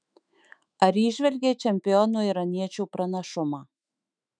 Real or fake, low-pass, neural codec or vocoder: fake; 9.9 kHz; autoencoder, 48 kHz, 128 numbers a frame, DAC-VAE, trained on Japanese speech